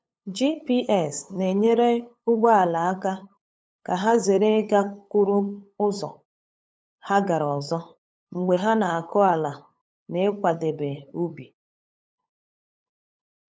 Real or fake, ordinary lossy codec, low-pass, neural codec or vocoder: fake; none; none; codec, 16 kHz, 8 kbps, FunCodec, trained on LibriTTS, 25 frames a second